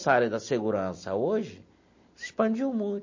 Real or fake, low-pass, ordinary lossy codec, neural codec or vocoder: real; 7.2 kHz; MP3, 32 kbps; none